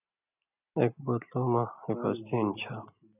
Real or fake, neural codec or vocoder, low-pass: real; none; 3.6 kHz